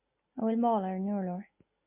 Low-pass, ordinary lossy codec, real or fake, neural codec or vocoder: 3.6 kHz; MP3, 24 kbps; real; none